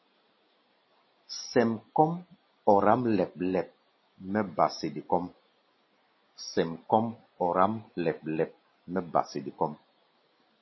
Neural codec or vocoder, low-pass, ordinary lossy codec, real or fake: none; 7.2 kHz; MP3, 24 kbps; real